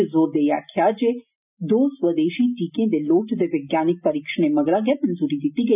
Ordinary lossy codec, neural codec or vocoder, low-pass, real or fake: none; none; 3.6 kHz; real